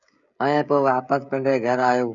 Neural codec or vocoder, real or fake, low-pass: codec, 16 kHz, 16 kbps, FreqCodec, smaller model; fake; 7.2 kHz